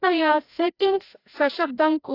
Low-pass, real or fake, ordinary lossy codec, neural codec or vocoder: 5.4 kHz; fake; AAC, 32 kbps; codec, 16 kHz, 0.5 kbps, FreqCodec, larger model